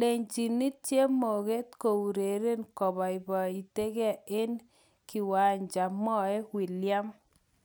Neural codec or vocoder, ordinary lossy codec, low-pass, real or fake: none; none; none; real